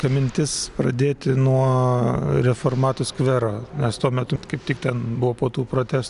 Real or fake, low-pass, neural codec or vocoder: real; 10.8 kHz; none